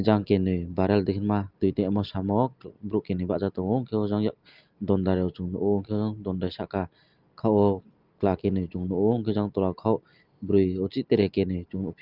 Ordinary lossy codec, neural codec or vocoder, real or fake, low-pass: Opus, 24 kbps; none; real; 5.4 kHz